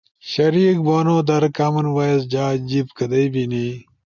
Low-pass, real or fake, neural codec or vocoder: 7.2 kHz; real; none